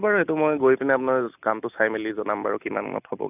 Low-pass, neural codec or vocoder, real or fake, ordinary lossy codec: 3.6 kHz; none; real; none